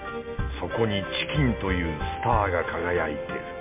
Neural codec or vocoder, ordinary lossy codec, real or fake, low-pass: none; MP3, 24 kbps; real; 3.6 kHz